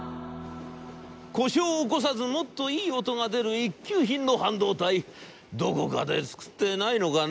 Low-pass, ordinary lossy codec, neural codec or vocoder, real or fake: none; none; none; real